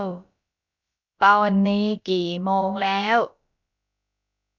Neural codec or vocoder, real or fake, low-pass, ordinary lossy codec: codec, 16 kHz, about 1 kbps, DyCAST, with the encoder's durations; fake; 7.2 kHz; none